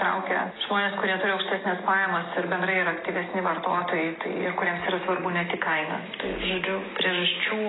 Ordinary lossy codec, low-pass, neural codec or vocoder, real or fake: AAC, 16 kbps; 7.2 kHz; none; real